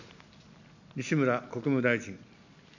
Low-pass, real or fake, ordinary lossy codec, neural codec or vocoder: 7.2 kHz; real; none; none